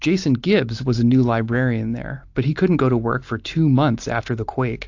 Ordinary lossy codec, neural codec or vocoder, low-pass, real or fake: AAC, 48 kbps; none; 7.2 kHz; real